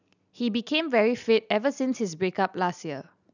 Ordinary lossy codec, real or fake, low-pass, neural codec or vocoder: none; real; 7.2 kHz; none